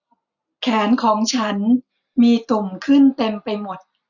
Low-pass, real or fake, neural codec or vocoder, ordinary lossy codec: 7.2 kHz; real; none; none